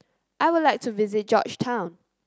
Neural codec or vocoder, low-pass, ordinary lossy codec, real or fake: none; none; none; real